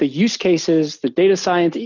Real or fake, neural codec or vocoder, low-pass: real; none; 7.2 kHz